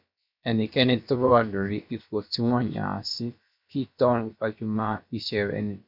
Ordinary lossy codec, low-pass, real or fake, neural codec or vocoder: none; 5.4 kHz; fake; codec, 16 kHz, about 1 kbps, DyCAST, with the encoder's durations